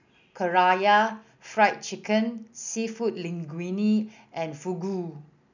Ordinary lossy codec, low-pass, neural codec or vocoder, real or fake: none; 7.2 kHz; none; real